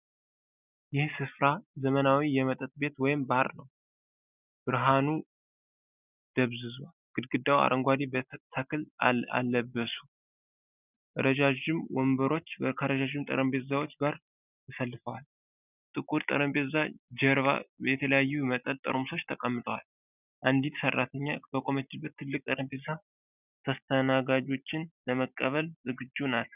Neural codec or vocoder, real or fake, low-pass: none; real; 3.6 kHz